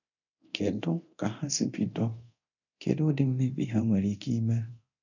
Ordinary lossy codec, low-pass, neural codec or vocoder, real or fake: none; 7.2 kHz; codec, 24 kHz, 0.9 kbps, DualCodec; fake